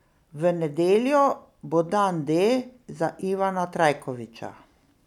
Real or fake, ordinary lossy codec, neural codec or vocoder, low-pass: real; none; none; 19.8 kHz